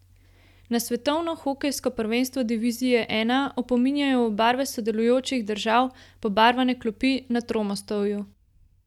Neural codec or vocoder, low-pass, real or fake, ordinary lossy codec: none; 19.8 kHz; real; none